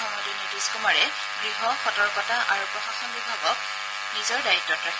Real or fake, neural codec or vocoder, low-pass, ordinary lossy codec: real; none; none; none